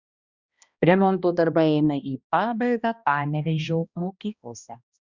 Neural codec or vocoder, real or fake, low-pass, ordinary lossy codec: codec, 16 kHz, 1 kbps, X-Codec, HuBERT features, trained on balanced general audio; fake; 7.2 kHz; Opus, 64 kbps